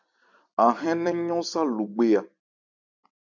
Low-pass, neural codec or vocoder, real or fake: 7.2 kHz; vocoder, 44.1 kHz, 128 mel bands every 256 samples, BigVGAN v2; fake